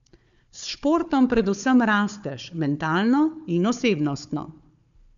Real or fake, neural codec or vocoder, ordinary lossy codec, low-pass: fake; codec, 16 kHz, 4 kbps, FunCodec, trained on Chinese and English, 50 frames a second; none; 7.2 kHz